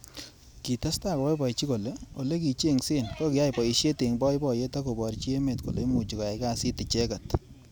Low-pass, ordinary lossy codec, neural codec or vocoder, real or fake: none; none; none; real